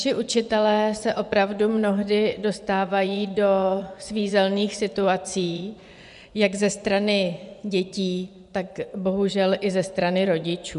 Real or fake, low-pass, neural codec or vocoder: fake; 10.8 kHz; vocoder, 24 kHz, 100 mel bands, Vocos